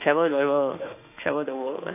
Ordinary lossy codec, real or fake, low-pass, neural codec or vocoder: AAC, 32 kbps; fake; 3.6 kHz; autoencoder, 48 kHz, 32 numbers a frame, DAC-VAE, trained on Japanese speech